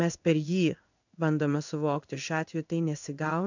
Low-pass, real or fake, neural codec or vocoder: 7.2 kHz; fake; codec, 16 kHz in and 24 kHz out, 1 kbps, XY-Tokenizer